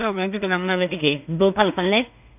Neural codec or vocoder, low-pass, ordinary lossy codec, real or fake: codec, 16 kHz in and 24 kHz out, 0.4 kbps, LongCat-Audio-Codec, two codebook decoder; 3.6 kHz; none; fake